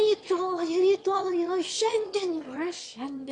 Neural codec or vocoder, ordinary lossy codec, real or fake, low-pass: autoencoder, 22.05 kHz, a latent of 192 numbers a frame, VITS, trained on one speaker; AAC, 48 kbps; fake; 9.9 kHz